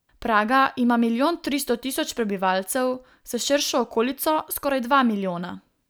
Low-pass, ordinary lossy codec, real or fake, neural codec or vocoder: none; none; real; none